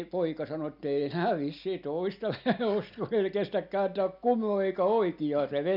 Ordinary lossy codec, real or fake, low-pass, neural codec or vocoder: none; real; 5.4 kHz; none